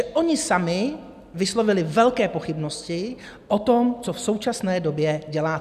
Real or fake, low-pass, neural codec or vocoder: real; 14.4 kHz; none